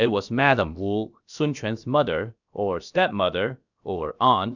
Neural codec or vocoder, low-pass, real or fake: codec, 16 kHz, about 1 kbps, DyCAST, with the encoder's durations; 7.2 kHz; fake